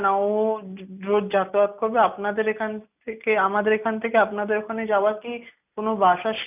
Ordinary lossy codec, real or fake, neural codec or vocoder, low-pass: none; real; none; 3.6 kHz